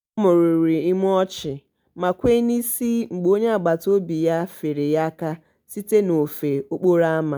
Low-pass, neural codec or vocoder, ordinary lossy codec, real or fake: none; none; none; real